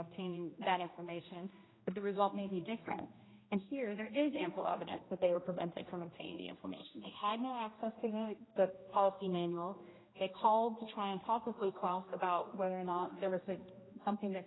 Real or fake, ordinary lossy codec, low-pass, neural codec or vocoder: fake; AAC, 16 kbps; 7.2 kHz; codec, 16 kHz, 1 kbps, X-Codec, HuBERT features, trained on general audio